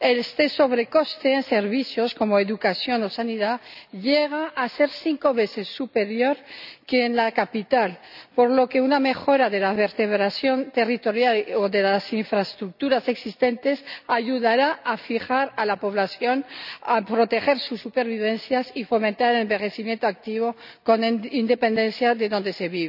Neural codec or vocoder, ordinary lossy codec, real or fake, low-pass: none; none; real; 5.4 kHz